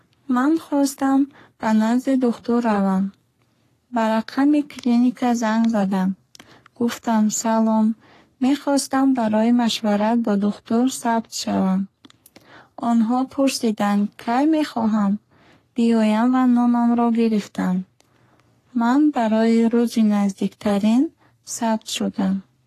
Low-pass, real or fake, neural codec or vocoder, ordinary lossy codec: 14.4 kHz; fake; codec, 44.1 kHz, 3.4 kbps, Pupu-Codec; AAC, 48 kbps